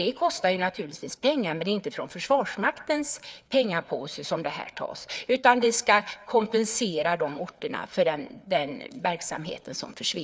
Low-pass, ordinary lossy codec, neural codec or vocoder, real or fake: none; none; codec, 16 kHz, 4 kbps, FreqCodec, larger model; fake